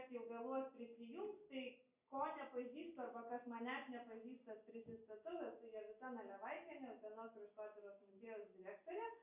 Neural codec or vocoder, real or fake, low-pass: none; real; 3.6 kHz